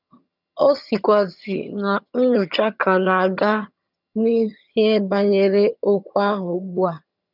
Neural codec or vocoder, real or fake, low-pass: vocoder, 22.05 kHz, 80 mel bands, HiFi-GAN; fake; 5.4 kHz